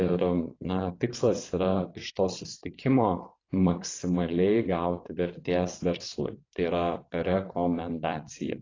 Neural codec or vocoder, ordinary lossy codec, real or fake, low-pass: vocoder, 22.05 kHz, 80 mel bands, WaveNeXt; AAC, 32 kbps; fake; 7.2 kHz